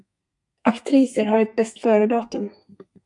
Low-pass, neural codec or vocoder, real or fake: 10.8 kHz; codec, 32 kHz, 1.9 kbps, SNAC; fake